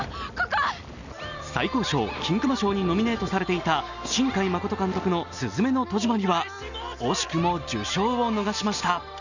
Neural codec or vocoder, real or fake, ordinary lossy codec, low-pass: none; real; none; 7.2 kHz